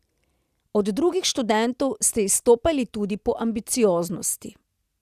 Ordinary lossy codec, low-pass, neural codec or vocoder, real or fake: Opus, 64 kbps; 14.4 kHz; none; real